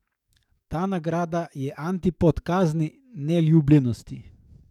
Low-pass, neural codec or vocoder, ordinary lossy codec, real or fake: 19.8 kHz; codec, 44.1 kHz, 7.8 kbps, DAC; none; fake